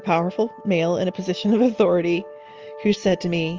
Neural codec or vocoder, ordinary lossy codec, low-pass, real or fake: none; Opus, 24 kbps; 7.2 kHz; real